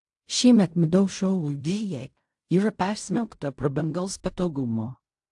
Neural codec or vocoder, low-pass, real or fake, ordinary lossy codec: codec, 16 kHz in and 24 kHz out, 0.4 kbps, LongCat-Audio-Codec, fine tuned four codebook decoder; 10.8 kHz; fake; AAC, 64 kbps